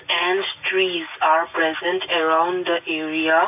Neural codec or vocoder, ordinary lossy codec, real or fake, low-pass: none; none; real; 3.6 kHz